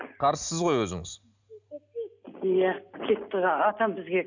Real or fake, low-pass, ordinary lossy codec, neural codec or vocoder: real; 7.2 kHz; none; none